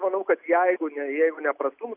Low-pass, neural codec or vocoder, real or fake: 3.6 kHz; none; real